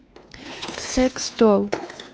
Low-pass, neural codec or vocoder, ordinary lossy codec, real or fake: none; codec, 16 kHz, 2 kbps, X-Codec, WavLM features, trained on Multilingual LibriSpeech; none; fake